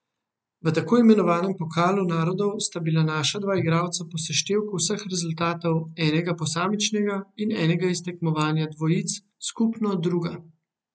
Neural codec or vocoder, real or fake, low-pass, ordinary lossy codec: none; real; none; none